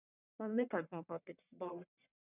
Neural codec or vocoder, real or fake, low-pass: codec, 44.1 kHz, 1.7 kbps, Pupu-Codec; fake; 3.6 kHz